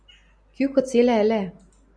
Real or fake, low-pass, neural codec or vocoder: real; 9.9 kHz; none